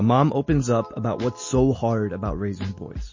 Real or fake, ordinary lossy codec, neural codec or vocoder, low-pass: real; MP3, 32 kbps; none; 7.2 kHz